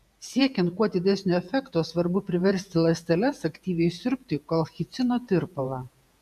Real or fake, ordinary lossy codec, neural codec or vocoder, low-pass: fake; AAC, 96 kbps; vocoder, 48 kHz, 128 mel bands, Vocos; 14.4 kHz